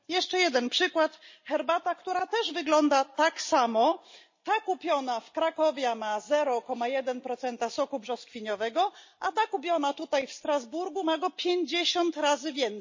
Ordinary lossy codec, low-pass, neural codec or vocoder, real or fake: MP3, 32 kbps; 7.2 kHz; none; real